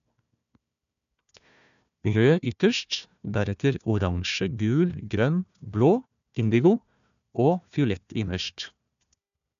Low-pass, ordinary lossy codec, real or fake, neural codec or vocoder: 7.2 kHz; none; fake; codec, 16 kHz, 1 kbps, FunCodec, trained on Chinese and English, 50 frames a second